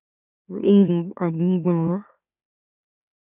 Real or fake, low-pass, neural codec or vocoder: fake; 3.6 kHz; autoencoder, 44.1 kHz, a latent of 192 numbers a frame, MeloTTS